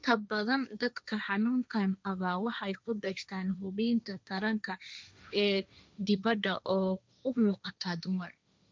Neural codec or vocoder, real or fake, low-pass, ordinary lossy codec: codec, 16 kHz, 1.1 kbps, Voila-Tokenizer; fake; none; none